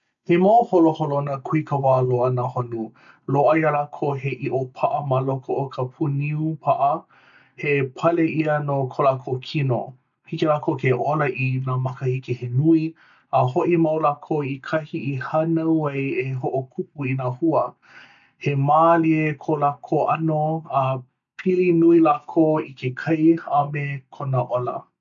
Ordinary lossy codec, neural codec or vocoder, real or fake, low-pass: none; none; real; 7.2 kHz